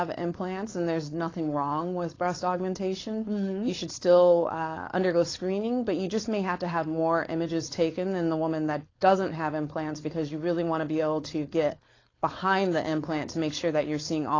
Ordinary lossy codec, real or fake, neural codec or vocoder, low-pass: AAC, 32 kbps; fake; codec, 16 kHz, 4.8 kbps, FACodec; 7.2 kHz